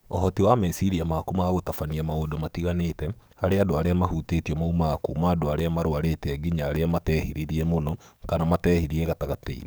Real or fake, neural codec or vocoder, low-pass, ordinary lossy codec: fake; codec, 44.1 kHz, 7.8 kbps, DAC; none; none